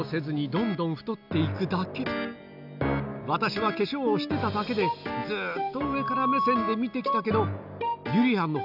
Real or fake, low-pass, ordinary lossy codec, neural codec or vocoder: real; 5.4 kHz; none; none